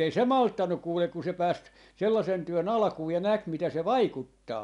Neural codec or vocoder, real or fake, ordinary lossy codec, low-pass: none; real; none; 10.8 kHz